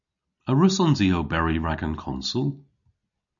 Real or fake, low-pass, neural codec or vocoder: real; 7.2 kHz; none